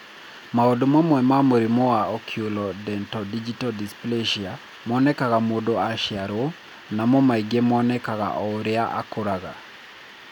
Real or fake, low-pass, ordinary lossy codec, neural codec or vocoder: real; 19.8 kHz; none; none